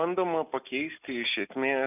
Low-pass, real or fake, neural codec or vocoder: 3.6 kHz; real; none